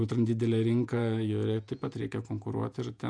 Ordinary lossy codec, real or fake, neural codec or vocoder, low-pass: MP3, 96 kbps; fake; vocoder, 48 kHz, 128 mel bands, Vocos; 9.9 kHz